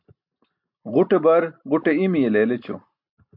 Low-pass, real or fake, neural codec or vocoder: 5.4 kHz; real; none